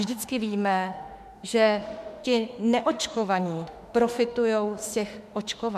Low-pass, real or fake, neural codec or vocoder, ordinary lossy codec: 14.4 kHz; fake; autoencoder, 48 kHz, 32 numbers a frame, DAC-VAE, trained on Japanese speech; MP3, 96 kbps